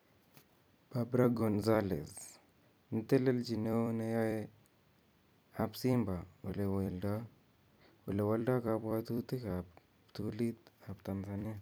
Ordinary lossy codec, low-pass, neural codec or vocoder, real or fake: none; none; vocoder, 44.1 kHz, 128 mel bands every 256 samples, BigVGAN v2; fake